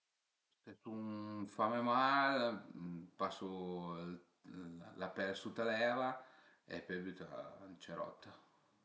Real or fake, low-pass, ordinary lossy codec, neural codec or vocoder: real; none; none; none